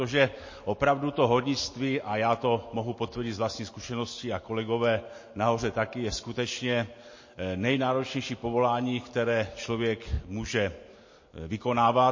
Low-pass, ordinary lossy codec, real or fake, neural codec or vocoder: 7.2 kHz; MP3, 32 kbps; real; none